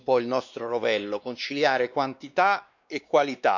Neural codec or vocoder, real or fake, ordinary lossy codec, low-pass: codec, 16 kHz, 2 kbps, X-Codec, WavLM features, trained on Multilingual LibriSpeech; fake; none; 7.2 kHz